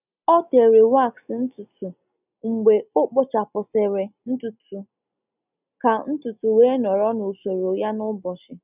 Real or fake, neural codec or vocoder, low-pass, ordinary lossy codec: real; none; 3.6 kHz; none